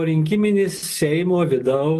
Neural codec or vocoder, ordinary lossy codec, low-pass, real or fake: none; Opus, 32 kbps; 14.4 kHz; real